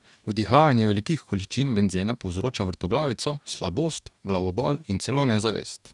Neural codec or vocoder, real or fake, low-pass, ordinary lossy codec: codec, 44.1 kHz, 2.6 kbps, DAC; fake; 10.8 kHz; none